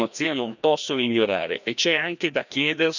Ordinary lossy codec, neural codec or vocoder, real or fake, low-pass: none; codec, 16 kHz, 1 kbps, FreqCodec, larger model; fake; 7.2 kHz